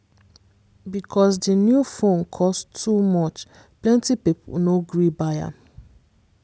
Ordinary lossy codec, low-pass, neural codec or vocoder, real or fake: none; none; none; real